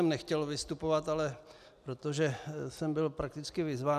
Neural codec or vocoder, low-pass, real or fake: none; 14.4 kHz; real